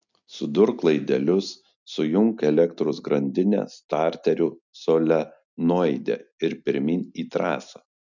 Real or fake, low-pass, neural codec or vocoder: real; 7.2 kHz; none